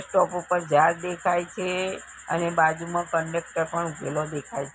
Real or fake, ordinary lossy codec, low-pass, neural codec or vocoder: real; none; none; none